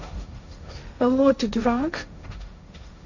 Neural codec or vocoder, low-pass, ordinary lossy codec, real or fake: codec, 16 kHz, 1.1 kbps, Voila-Tokenizer; 7.2 kHz; none; fake